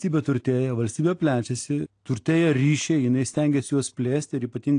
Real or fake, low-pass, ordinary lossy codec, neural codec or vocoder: real; 9.9 kHz; AAC, 48 kbps; none